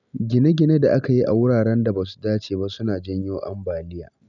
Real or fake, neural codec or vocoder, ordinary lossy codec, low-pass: real; none; none; 7.2 kHz